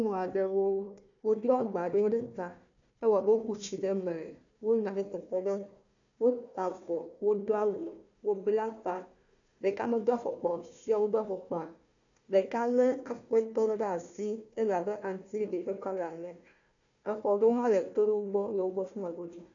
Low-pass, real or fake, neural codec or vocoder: 7.2 kHz; fake; codec, 16 kHz, 1 kbps, FunCodec, trained on Chinese and English, 50 frames a second